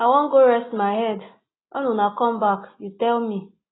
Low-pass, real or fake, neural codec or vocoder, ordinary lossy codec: 7.2 kHz; real; none; AAC, 16 kbps